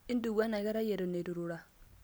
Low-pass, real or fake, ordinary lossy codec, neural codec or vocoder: none; real; none; none